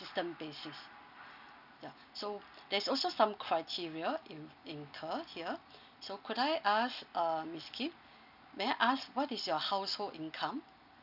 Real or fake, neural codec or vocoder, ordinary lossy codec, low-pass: real; none; none; 5.4 kHz